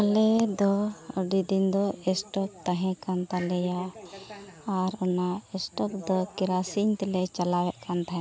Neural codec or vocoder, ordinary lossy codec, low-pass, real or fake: none; none; none; real